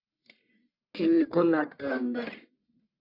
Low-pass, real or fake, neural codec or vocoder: 5.4 kHz; fake; codec, 44.1 kHz, 1.7 kbps, Pupu-Codec